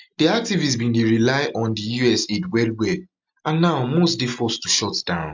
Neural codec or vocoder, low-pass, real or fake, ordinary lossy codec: none; 7.2 kHz; real; MP3, 64 kbps